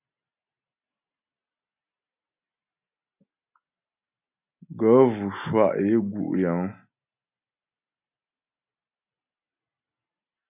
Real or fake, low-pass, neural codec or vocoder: real; 3.6 kHz; none